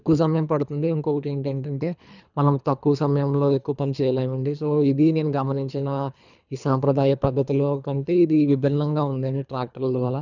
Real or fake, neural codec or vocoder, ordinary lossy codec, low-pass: fake; codec, 24 kHz, 3 kbps, HILCodec; none; 7.2 kHz